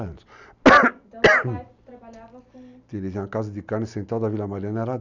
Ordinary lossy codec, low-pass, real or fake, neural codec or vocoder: none; 7.2 kHz; real; none